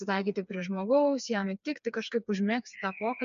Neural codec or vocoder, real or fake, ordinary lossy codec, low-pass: codec, 16 kHz, 4 kbps, FreqCodec, smaller model; fake; MP3, 64 kbps; 7.2 kHz